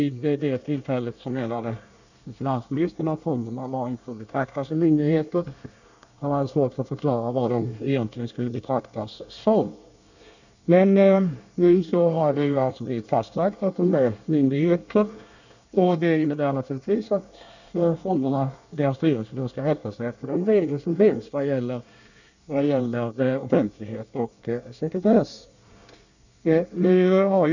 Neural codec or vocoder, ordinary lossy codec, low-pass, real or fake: codec, 24 kHz, 1 kbps, SNAC; none; 7.2 kHz; fake